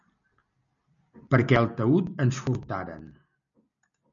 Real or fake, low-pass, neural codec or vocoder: real; 7.2 kHz; none